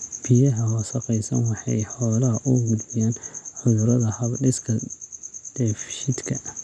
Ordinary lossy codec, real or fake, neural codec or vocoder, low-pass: none; real; none; none